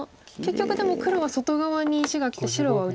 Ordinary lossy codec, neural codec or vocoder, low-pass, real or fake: none; none; none; real